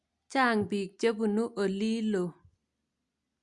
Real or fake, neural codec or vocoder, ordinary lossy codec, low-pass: real; none; Opus, 64 kbps; 10.8 kHz